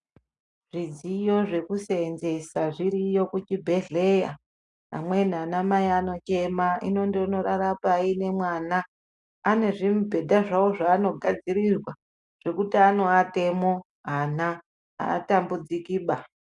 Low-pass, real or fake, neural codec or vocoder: 10.8 kHz; real; none